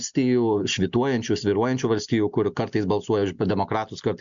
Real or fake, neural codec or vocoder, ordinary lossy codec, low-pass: real; none; MP3, 64 kbps; 7.2 kHz